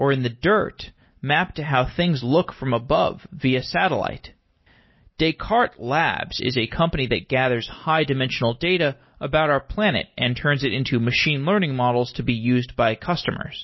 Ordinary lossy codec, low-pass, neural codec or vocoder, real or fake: MP3, 24 kbps; 7.2 kHz; none; real